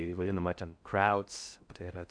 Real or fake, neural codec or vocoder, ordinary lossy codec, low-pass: fake; codec, 16 kHz in and 24 kHz out, 0.6 kbps, FocalCodec, streaming, 4096 codes; MP3, 96 kbps; 9.9 kHz